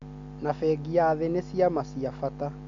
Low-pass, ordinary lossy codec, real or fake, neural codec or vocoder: 7.2 kHz; MP3, 64 kbps; real; none